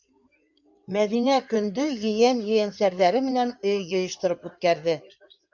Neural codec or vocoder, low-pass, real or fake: codec, 16 kHz in and 24 kHz out, 2.2 kbps, FireRedTTS-2 codec; 7.2 kHz; fake